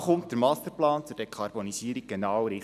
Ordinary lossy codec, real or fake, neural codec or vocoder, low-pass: none; real; none; 14.4 kHz